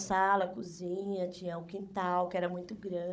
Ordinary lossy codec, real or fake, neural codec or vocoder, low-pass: none; fake; codec, 16 kHz, 16 kbps, FunCodec, trained on Chinese and English, 50 frames a second; none